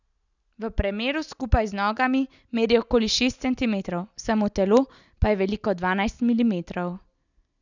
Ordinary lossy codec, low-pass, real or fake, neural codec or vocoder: none; 7.2 kHz; real; none